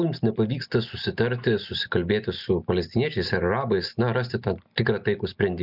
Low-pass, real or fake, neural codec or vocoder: 5.4 kHz; real; none